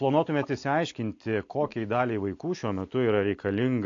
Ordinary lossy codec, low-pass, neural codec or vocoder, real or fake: AAC, 48 kbps; 7.2 kHz; none; real